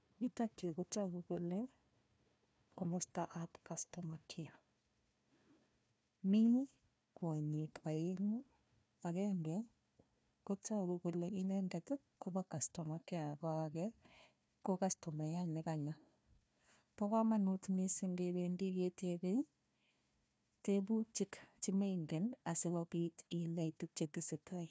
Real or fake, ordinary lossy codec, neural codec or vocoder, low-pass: fake; none; codec, 16 kHz, 1 kbps, FunCodec, trained on Chinese and English, 50 frames a second; none